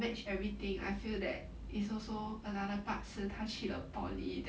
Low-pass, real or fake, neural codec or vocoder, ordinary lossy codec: none; real; none; none